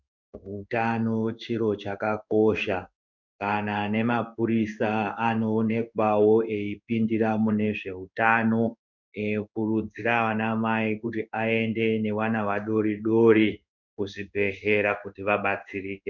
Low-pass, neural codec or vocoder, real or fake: 7.2 kHz; codec, 16 kHz in and 24 kHz out, 1 kbps, XY-Tokenizer; fake